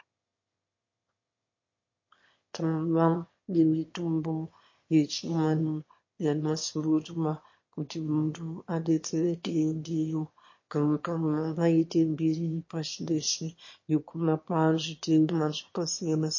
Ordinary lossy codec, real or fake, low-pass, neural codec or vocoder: MP3, 32 kbps; fake; 7.2 kHz; autoencoder, 22.05 kHz, a latent of 192 numbers a frame, VITS, trained on one speaker